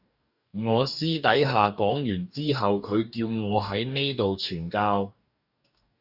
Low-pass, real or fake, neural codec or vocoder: 5.4 kHz; fake; codec, 44.1 kHz, 2.6 kbps, DAC